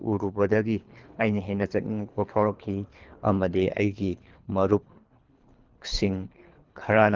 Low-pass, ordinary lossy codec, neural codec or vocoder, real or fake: 7.2 kHz; Opus, 32 kbps; codec, 24 kHz, 3 kbps, HILCodec; fake